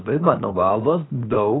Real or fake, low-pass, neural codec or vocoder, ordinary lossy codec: fake; 7.2 kHz; codec, 16 kHz, 0.7 kbps, FocalCodec; AAC, 16 kbps